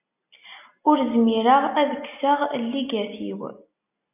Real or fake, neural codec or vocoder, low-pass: real; none; 3.6 kHz